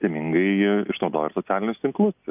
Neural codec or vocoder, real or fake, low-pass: none; real; 3.6 kHz